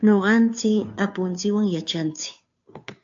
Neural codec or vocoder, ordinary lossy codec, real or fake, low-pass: codec, 16 kHz, 2 kbps, FunCodec, trained on Chinese and English, 25 frames a second; AAC, 64 kbps; fake; 7.2 kHz